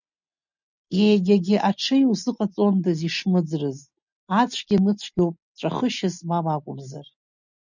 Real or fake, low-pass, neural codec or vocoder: real; 7.2 kHz; none